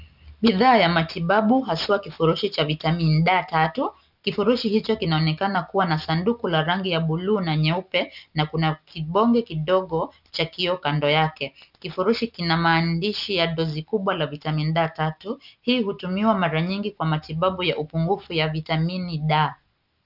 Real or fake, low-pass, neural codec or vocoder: real; 5.4 kHz; none